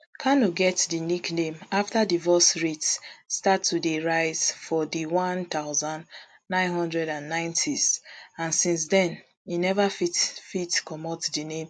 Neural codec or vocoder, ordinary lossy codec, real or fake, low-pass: none; MP3, 64 kbps; real; 9.9 kHz